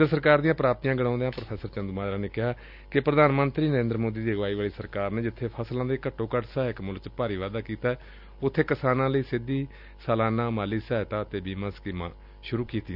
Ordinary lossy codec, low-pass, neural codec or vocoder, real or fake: none; 5.4 kHz; none; real